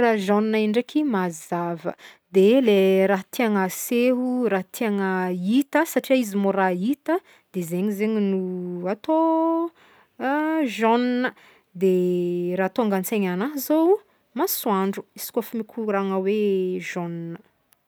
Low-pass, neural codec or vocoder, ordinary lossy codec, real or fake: none; none; none; real